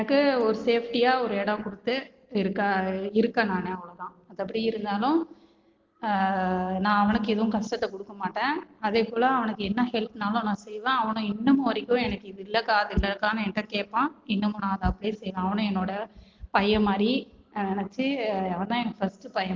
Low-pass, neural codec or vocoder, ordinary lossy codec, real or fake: 7.2 kHz; none; Opus, 16 kbps; real